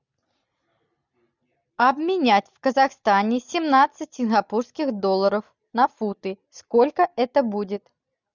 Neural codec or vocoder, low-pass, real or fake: none; 7.2 kHz; real